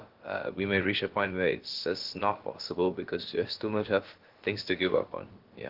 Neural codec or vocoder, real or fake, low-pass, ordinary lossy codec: codec, 16 kHz, about 1 kbps, DyCAST, with the encoder's durations; fake; 5.4 kHz; Opus, 16 kbps